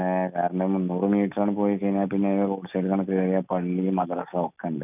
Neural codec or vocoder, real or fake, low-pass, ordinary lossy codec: none; real; 3.6 kHz; none